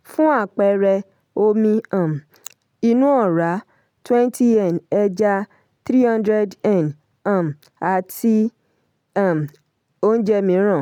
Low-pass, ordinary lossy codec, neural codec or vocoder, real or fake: 19.8 kHz; none; none; real